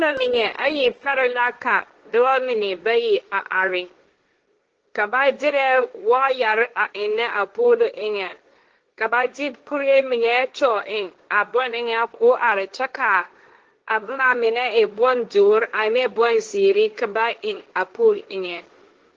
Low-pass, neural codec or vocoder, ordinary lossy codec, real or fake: 7.2 kHz; codec, 16 kHz, 1.1 kbps, Voila-Tokenizer; Opus, 16 kbps; fake